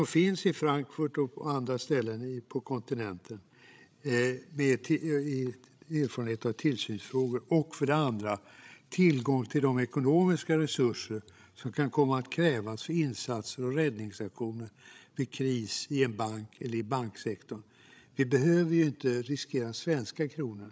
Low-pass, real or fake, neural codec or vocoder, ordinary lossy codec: none; fake; codec, 16 kHz, 16 kbps, FreqCodec, larger model; none